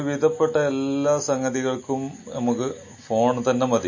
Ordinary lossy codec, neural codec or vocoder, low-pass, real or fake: MP3, 32 kbps; none; 7.2 kHz; real